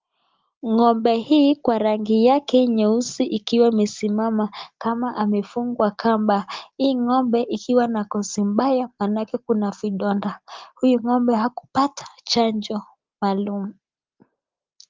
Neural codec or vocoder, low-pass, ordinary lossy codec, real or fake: none; 7.2 kHz; Opus, 32 kbps; real